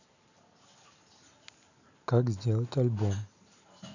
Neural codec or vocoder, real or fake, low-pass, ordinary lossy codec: none; real; 7.2 kHz; none